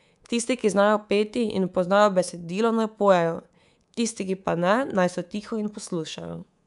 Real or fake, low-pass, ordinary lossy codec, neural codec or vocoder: fake; 10.8 kHz; none; codec, 24 kHz, 3.1 kbps, DualCodec